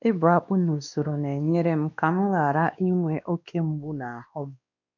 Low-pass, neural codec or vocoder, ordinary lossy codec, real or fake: 7.2 kHz; codec, 16 kHz, 2 kbps, X-Codec, WavLM features, trained on Multilingual LibriSpeech; none; fake